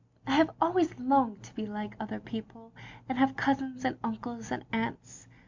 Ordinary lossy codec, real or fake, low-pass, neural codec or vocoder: MP3, 64 kbps; real; 7.2 kHz; none